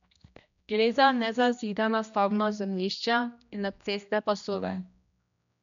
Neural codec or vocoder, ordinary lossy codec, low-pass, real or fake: codec, 16 kHz, 1 kbps, X-Codec, HuBERT features, trained on general audio; none; 7.2 kHz; fake